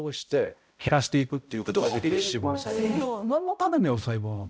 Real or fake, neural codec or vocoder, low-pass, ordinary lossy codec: fake; codec, 16 kHz, 0.5 kbps, X-Codec, HuBERT features, trained on balanced general audio; none; none